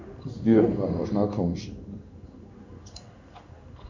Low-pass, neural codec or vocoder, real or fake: 7.2 kHz; codec, 16 kHz in and 24 kHz out, 1 kbps, XY-Tokenizer; fake